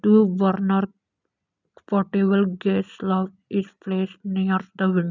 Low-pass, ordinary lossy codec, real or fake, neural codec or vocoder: 7.2 kHz; none; real; none